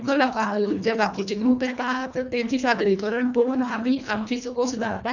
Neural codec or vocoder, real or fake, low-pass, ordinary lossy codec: codec, 24 kHz, 1.5 kbps, HILCodec; fake; 7.2 kHz; none